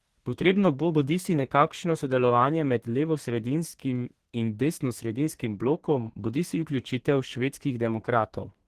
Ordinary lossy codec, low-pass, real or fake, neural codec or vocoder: Opus, 16 kbps; 14.4 kHz; fake; codec, 32 kHz, 1.9 kbps, SNAC